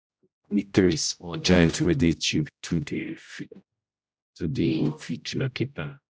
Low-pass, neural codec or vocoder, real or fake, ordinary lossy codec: none; codec, 16 kHz, 0.5 kbps, X-Codec, HuBERT features, trained on general audio; fake; none